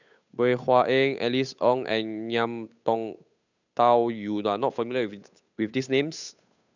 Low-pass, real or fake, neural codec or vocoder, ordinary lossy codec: 7.2 kHz; fake; codec, 16 kHz, 8 kbps, FunCodec, trained on Chinese and English, 25 frames a second; none